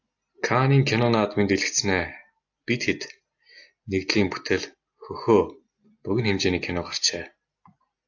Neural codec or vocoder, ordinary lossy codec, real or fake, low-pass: none; AAC, 48 kbps; real; 7.2 kHz